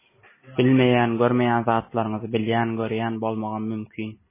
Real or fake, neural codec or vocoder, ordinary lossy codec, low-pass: real; none; MP3, 16 kbps; 3.6 kHz